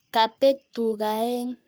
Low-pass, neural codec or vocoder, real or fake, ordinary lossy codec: none; codec, 44.1 kHz, 3.4 kbps, Pupu-Codec; fake; none